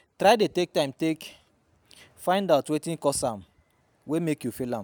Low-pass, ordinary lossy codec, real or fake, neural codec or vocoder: none; none; real; none